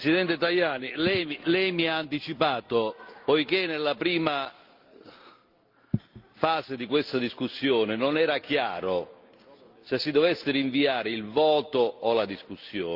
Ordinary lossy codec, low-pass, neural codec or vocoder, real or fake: Opus, 24 kbps; 5.4 kHz; none; real